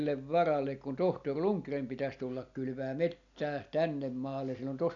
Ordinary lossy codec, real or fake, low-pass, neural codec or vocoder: none; real; 7.2 kHz; none